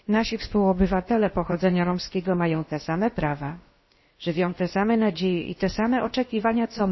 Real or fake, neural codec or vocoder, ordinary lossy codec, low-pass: fake; codec, 16 kHz, about 1 kbps, DyCAST, with the encoder's durations; MP3, 24 kbps; 7.2 kHz